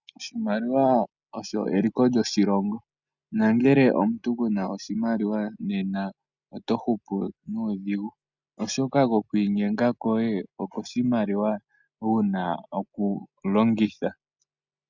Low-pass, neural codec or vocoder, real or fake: 7.2 kHz; none; real